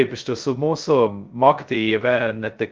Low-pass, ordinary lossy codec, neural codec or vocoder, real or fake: 7.2 kHz; Opus, 32 kbps; codec, 16 kHz, 0.2 kbps, FocalCodec; fake